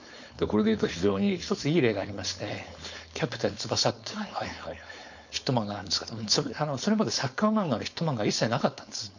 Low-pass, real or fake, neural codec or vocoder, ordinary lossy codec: 7.2 kHz; fake; codec, 16 kHz, 4.8 kbps, FACodec; none